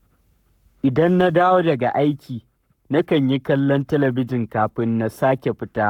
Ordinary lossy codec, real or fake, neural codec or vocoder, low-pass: none; fake; codec, 44.1 kHz, 7.8 kbps, Pupu-Codec; 19.8 kHz